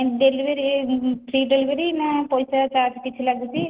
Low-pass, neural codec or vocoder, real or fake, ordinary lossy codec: 3.6 kHz; none; real; Opus, 16 kbps